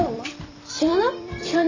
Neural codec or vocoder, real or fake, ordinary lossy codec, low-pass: vocoder, 44.1 kHz, 128 mel bands every 256 samples, BigVGAN v2; fake; AAC, 48 kbps; 7.2 kHz